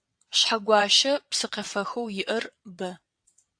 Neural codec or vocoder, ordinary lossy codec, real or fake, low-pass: vocoder, 22.05 kHz, 80 mel bands, WaveNeXt; AAC, 64 kbps; fake; 9.9 kHz